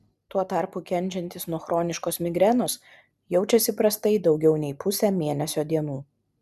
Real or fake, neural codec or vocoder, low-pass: real; none; 14.4 kHz